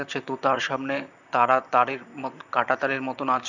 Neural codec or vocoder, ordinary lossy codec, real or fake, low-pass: vocoder, 44.1 kHz, 128 mel bands, Pupu-Vocoder; none; fake; 7.2 kHz